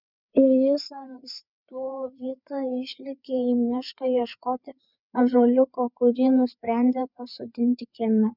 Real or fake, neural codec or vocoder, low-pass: fake; codec, 16 kHz, 4 kbps, FreqCodec, larger model; 5.4 kHz